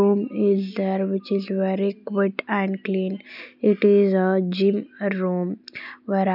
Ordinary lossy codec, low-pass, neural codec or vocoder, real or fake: none; 5.4 kHz; none; real